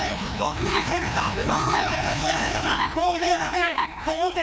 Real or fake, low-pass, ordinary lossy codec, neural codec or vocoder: fake; none; none; codec, 16 kHz, 1 kbps, FreqCodec, larger model